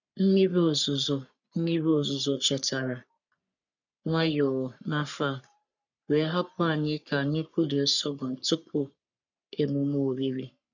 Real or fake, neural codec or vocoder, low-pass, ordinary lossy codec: fake; codec, 44.1 kHz, 3.4 kbps, Pupu-Codec; 7.2 kHz; none